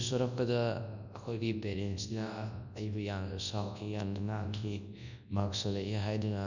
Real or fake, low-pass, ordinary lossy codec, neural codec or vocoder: fake; 7.2 kHz; none; codec, 24 kHz, 0.9 kbps, WavTokenizer, large speech release